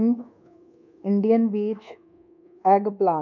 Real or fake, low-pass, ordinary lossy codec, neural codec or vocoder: fake; 7.2 kHz; MP3, 64 kbps; codec, 24 kHz, 1.2 kbps, DualCodec